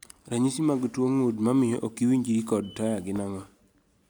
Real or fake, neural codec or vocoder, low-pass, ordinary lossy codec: real; none; none; none